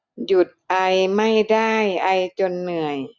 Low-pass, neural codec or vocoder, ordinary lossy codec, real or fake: 7.2 kHz; none; none; real